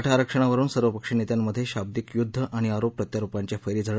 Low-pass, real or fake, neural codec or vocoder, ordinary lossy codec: none; real; none; none